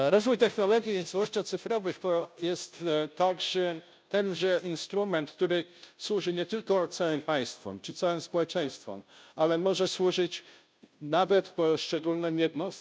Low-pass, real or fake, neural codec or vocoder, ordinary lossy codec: none; fake; codec, 16 kHz, 0.5 kbps, FunCodec, trained on Chinese and English, 25 frames a second; none